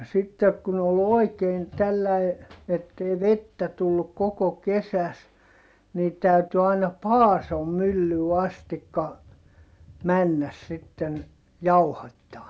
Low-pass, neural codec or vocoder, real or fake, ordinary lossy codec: none; none; real; none